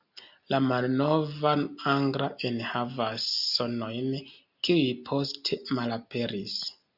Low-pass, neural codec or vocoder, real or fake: 5.4 kHz; none; real